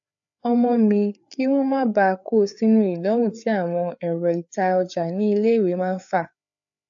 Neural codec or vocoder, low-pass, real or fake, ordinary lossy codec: codec, 16 kHz, 4 kbps, FreqCodec, larger model; 7.2 kHz; fake; AAC, 64 kbps